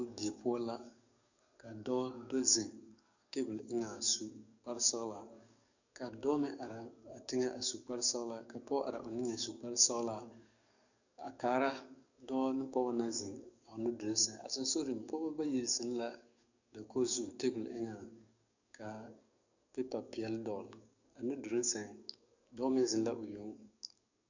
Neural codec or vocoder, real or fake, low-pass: codec, 44.1 kHz, 7.8 kbps, DAC; fake; 7.2 kHz